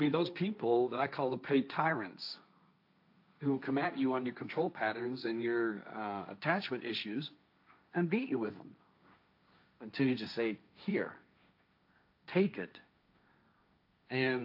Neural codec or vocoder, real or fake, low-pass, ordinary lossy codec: codec, 16 kHz, 1.1 kbps, Voila-Tokenizer; fake; 5.4 kHz; AAC, 48 kbps